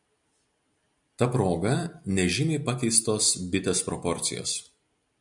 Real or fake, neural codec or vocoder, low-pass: real; none; 10.8 kHz